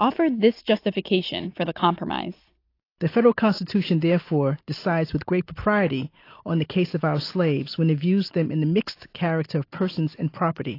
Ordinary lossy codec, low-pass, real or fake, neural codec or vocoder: AAC, 32 kbps; 5.4 kHz; real; none